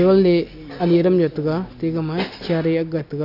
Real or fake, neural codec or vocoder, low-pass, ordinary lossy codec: real; none; 5.4 kHz; none